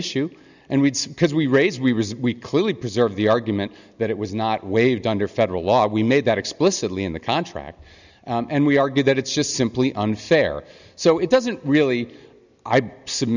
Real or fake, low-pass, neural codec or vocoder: real; 7.2 kHz; none